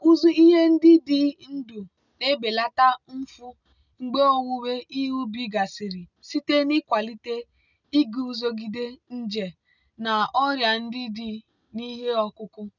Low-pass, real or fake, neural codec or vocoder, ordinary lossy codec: 7.2 kHz; real; none; none